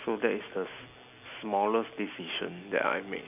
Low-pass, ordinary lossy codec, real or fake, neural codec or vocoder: 3.6 kHz; none; real; none